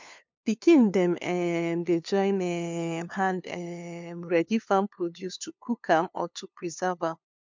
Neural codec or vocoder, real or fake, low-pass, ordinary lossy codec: codec, 16 kHz, 2 kbps, FunCodec, trained on LibriTTS, 25 frames a second; fake; 7.2 kHz; MP3, 64 kbps